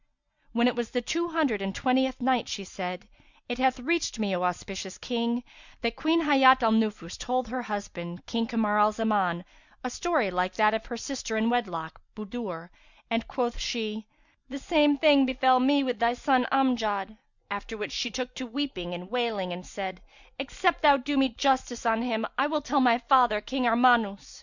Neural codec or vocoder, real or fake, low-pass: none; real; 7.2 kHz